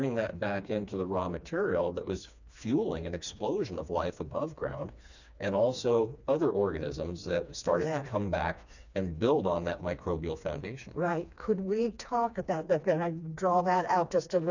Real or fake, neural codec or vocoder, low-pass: fake; codec, 16 kHz, 2 kbps, FreqCodec, smaller model; 7.2 kHz